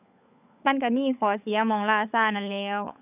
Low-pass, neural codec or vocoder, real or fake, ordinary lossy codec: 3.6 kHz; codec, 16 kHz, 16 kbps, FunCodec, trained on LibriTTS, 50 frames a second; fake; none